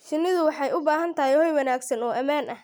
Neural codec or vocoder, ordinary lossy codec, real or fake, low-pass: none; none; real; none